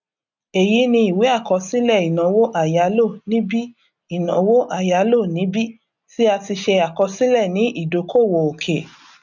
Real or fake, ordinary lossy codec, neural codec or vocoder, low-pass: real; none; none; 7.2 kHz